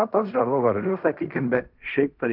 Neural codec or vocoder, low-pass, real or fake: codec, 16 kHz in and 24 kHz out, 0.4 kbps, LongCat-Audio-Codec, fine tuned four codebook decoder; 5.4 kHz; fake